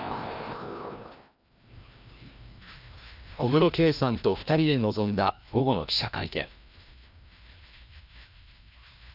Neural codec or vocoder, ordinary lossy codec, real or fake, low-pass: codec, 16 kHz, 1 kbps, FreqCodec, larger model; none; fake; 5.4 kHz